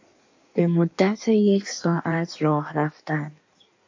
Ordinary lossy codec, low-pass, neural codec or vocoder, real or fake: AAC, 48 kbps; 7.2 kHz; codec, 16 kHz in and 24 kHz out, 1.1 kbps, FireRedTTS-2 codec; fake